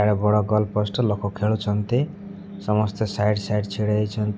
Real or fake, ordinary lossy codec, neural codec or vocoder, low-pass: real; none; none; none